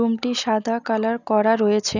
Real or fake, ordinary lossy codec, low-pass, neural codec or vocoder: real; none; 7.2 kHz; none